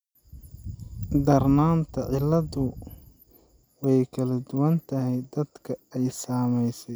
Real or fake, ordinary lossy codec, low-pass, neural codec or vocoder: real; none; none; none